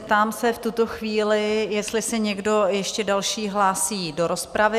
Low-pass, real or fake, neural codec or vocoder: 14.4 kHz; real; none